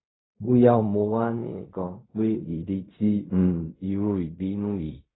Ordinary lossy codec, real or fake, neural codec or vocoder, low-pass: AAC, 16 kbps; fake; codec, 16 kHz in and 24 kHz out, 0.4 kbps, LongCat-Audio-Codec, fine tuned four codebook decoder; 7.2 kHz